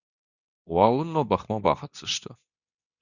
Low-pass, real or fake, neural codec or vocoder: 7.2 kHz; fake; codec, 24 kHz, 0.9 kbps, WavTokenizer, medium speech release version 2